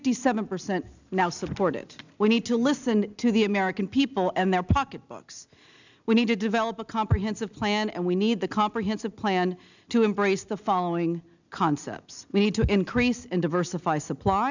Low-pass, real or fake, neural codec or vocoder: 7.2 kHz; real; none